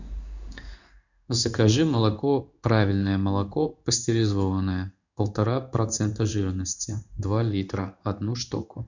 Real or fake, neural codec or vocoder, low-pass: fake; codec, 16 kHz in and 24 kHz out, 1 kbps, XY-Tokenizer; 7.2 kHz